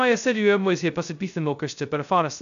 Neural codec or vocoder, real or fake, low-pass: codec, 16 kHz, 0.2 kbps, FocalCodec; fake; 7.2 kHz